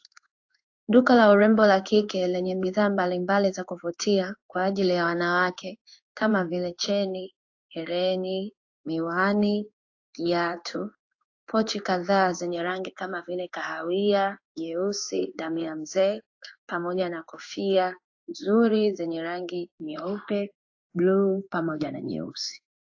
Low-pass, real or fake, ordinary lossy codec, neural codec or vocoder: 7.2 kHz; fake; AAC, 48 kbps; codec, 16 kHz in and 24 kHz out, 1 kbps, XY-Tokenizer